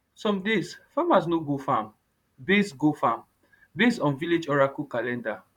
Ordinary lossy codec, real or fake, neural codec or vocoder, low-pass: none; fake; vocoder, 44.1 kHz, 128 mel bands, Pupu-Vocoder; 19.8 kHz